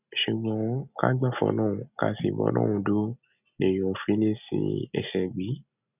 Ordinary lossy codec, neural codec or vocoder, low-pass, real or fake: none; none; 3.6 kHz; real